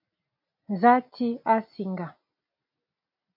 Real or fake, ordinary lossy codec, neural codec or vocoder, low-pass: real; MP3, 48 kbps; none; 5.4 kHz